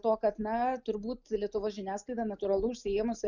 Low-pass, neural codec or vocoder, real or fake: 7.2 kHz; none; real